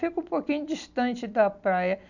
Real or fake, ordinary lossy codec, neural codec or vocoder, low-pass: real; none; none; 7.2 kHz